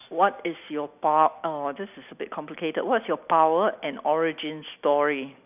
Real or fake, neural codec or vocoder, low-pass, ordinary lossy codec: real; none; 3.6 kHz; none